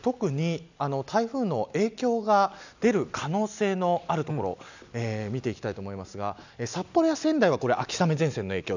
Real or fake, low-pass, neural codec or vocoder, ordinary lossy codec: real; 7.2 kHz; none; none